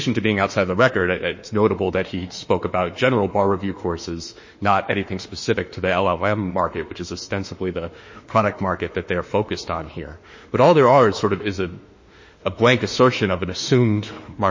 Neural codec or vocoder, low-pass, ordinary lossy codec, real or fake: autoencoder, 48 kHz, 32 numbers a frame, DAC-VAE, trained on Japanese speech; 7.2 kHz; MP3, 32 kbps; fake